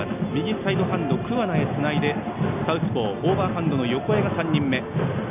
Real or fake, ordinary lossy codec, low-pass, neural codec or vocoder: real; none; 3.6 kHz; none